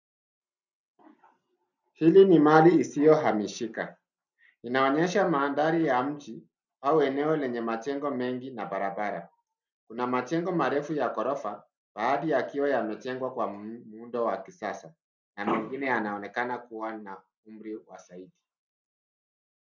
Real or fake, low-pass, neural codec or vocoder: real; 7.2 kHz; none